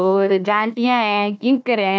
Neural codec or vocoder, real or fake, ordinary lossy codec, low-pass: codec, 16 kHz, 1 kbps, FunCodec, trained on Chinese and English, 50 frames a second; fake; none; none